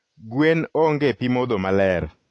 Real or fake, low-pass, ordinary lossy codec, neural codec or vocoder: real; 10.8 kHz; AAC, 48 kbps; none